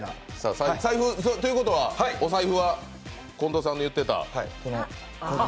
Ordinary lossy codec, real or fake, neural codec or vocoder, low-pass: none; real; none; none